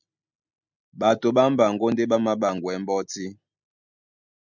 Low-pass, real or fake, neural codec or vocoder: 7.2 kHz; real; none